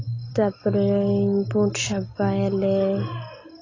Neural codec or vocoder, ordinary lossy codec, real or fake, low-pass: none; AAC, 32 kbps; real; 7.2 kHz